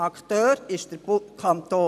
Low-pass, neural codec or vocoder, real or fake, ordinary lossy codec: 14.4 kHz; none; real; AAC, 96 kbps